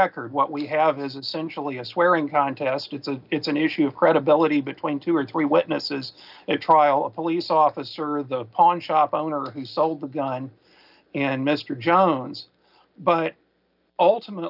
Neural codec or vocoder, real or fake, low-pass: none; real; 5.4 kHz